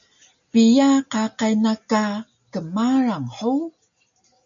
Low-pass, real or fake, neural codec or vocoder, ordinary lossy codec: 7.2 kHz; real; none; MP3, 64 kbps